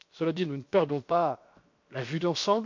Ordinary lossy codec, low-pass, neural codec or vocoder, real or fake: MP3, 48 kbps; 7.2 kHz; codec, 16 kHz, 0.7 kbps, FocalCodec; fake